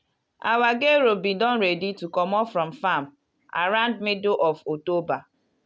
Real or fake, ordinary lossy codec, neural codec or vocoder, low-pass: real; none; none; none